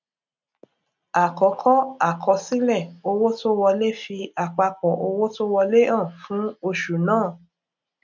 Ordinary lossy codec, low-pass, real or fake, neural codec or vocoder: none; 7.2 kHz; real; none